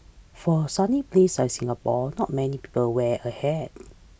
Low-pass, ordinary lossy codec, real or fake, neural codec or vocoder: none; none; real; none